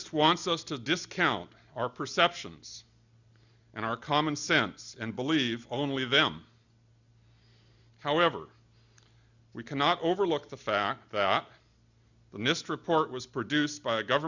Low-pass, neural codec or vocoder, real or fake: 7.2 kHz; none; real